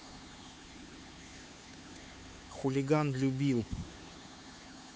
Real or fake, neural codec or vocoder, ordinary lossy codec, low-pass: fake; codec, 16 kHz, 4 kbps, X-Codec, HuBERT features, trained on LibriSpeech; none; none